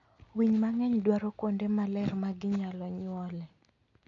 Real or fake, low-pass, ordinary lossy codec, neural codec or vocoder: real; 7.2 kHz; none; none